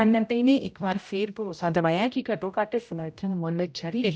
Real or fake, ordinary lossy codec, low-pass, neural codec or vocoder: fake; none; none; codec, 16 kHz, 0.5 kbps, X-Codec, HuBERT features, trained on general audio